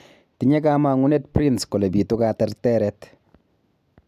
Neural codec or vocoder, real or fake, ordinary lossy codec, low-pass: none; real; none; 14.4 kHz